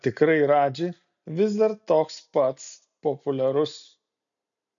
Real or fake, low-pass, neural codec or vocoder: real; 7.2 kHz; none